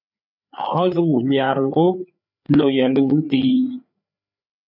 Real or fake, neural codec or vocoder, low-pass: fake; codec, 16 kHz, 4 kbps, FreqCodec, larger model; 5.4 kHz